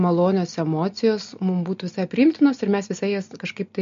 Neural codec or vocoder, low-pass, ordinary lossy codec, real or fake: none; 7.2 kHz; MP3, 48 kbps; real